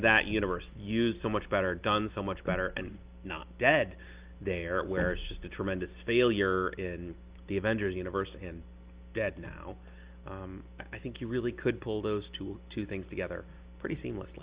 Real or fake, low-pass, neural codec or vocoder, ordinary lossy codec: real; 3.6 kHz; none; Opus, 32 kbps